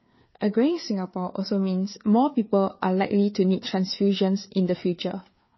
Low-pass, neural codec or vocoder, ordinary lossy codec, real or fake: 7.2 kHz; codec, 16 kHz, 4 kbps, FreqCodec, larger model; MP3, 24 kbps; fake